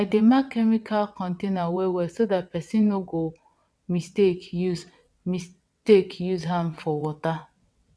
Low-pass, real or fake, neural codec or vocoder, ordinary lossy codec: none; fake; vocoder, 22.05 kHz, 80 mel bands, WaveNeXt; none